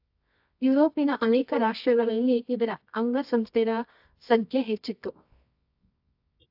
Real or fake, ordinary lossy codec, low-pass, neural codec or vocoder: fake; AAC, 48 kbps; 5.4 kHz; codec, 24 kHz, 0.9 kbps, WavTokenizer, medium music audio release